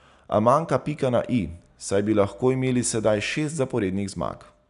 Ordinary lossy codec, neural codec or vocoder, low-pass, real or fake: none; none; 10.8 kHz; real